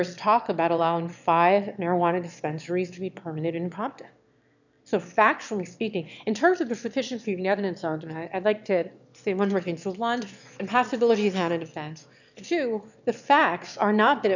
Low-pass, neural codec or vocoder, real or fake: 7.2 kHz; autoencoder, 22.05 kHz, a latent of 192 numbers a frame, VITS, trained on one speaker; fake